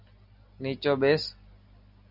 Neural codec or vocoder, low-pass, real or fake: none; 5.4 kHz; real